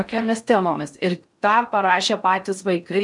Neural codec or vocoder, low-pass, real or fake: codec, 16 kHz in and 24 kHz out, 0.6 kbps, FocalCodec, streaming, 4096 codes; 10.8 kHz; fake